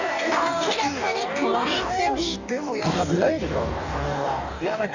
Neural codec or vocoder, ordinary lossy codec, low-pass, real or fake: codec, 44.1 kHz, 2.6 kbps, DAC; none; 7.2 kHz; fake